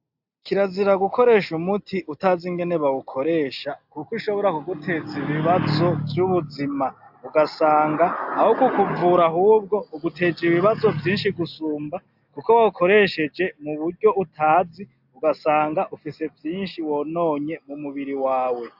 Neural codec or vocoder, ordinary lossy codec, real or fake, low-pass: none; AAC, 48 kbps; real; 5.4 kHz